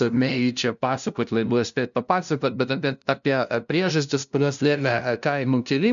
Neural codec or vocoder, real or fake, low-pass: codec, 16 kHz, 0.5 kbps, FunCodec, trained on LibriTTS, 25 frames a second; fake; 7.2 kHz